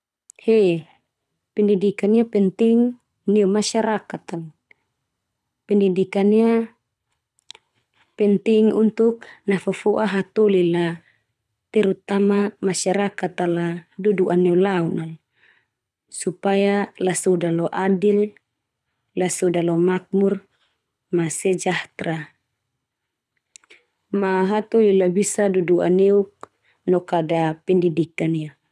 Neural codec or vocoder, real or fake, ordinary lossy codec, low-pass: codec, 24 kHz, 6 kbps, HILCodec; fake; none; none